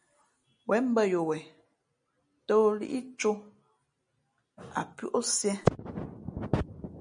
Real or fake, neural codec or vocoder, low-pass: real; none; 9.9 kHz